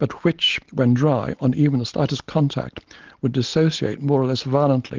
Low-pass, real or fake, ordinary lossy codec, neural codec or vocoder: 7.2 kHz; real; Opus, 16 kbps; none